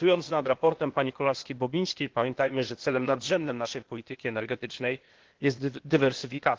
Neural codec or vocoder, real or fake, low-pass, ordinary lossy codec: codec, 16 kHz, 0.8 kbps, ZipCodec; fake; 7.2 kHz; Opus, 16 kbps